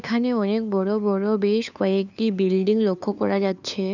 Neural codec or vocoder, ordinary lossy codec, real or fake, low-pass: codec, 16 kHz, 2 kbps, FunCodec, trained on LibriTTS, 25 frames a second; none; fake; 7.2 kHz